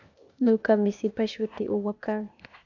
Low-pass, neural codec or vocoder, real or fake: 7.2 kHz; codec, 16 kHz, 0.8 kbps, ZipCodec; fake